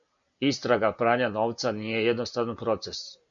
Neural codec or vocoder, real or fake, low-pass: none; real; 7.2 kHz